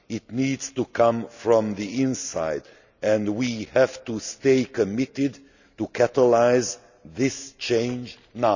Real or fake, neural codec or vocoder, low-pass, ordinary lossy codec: real; none; 7.2 kHz; none